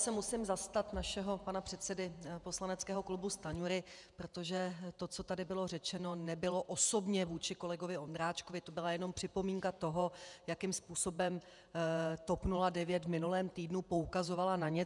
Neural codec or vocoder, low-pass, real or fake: vocoder, 48 kHz, 128 mel bands, Vocos; 10.8 kHz; fake